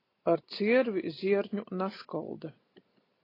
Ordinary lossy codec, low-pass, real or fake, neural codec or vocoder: AAC, 24 kbps; 5.4 kHz; real; none